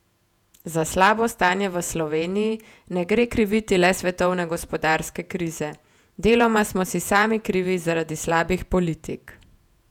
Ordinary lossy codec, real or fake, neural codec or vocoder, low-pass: none; fake; vocoder, 48 kHz, 128 mel bands, Vocos; 19.8 kHz